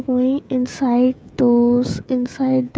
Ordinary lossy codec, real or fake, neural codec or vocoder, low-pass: none; fake; codec, 16 kHz, 16 kbps, FreqCodec, smaller model; none